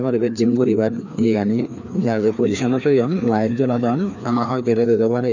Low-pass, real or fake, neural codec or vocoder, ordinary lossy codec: 7.2 kHz; fake; codec, 16 kHz, 2 kbps, FreqCodec, larger model; none